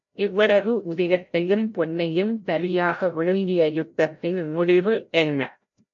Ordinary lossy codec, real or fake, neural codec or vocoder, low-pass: MP3, 48 kbps; fake; codec, 16 kHz, 0.5 kbps, FreqCodec, larger model; 7.2 kHz